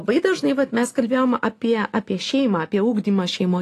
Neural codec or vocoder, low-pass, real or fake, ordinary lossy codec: none; 14.4 kHz; real; AAC, 48 kbps